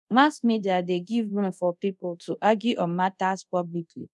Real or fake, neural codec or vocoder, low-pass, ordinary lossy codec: fake; codec, 24 kHz, 0.5 kbps, DualCodec; none; none